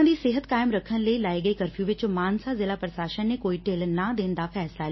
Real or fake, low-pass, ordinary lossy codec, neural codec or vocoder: real; 7.2 kHz; MP3, 24 kbps; none